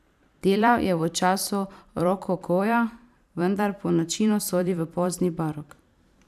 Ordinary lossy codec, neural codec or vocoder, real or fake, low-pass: none; vocoder, 44.1 kHz, 128 mel bands every 512 samples, BigVGAN v2; fake; 14.4 kHz